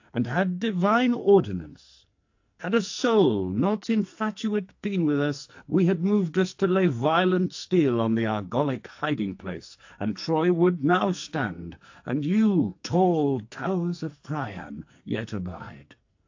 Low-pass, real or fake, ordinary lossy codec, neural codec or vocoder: 7.2 kHz; fake; AAC, 48 kbps; codec, 44.1 kHz, 2.6 kbps, SNAC